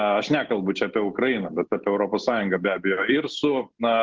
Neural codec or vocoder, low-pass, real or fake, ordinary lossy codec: none; 7.2 kHz; real; Opus, 16 kbps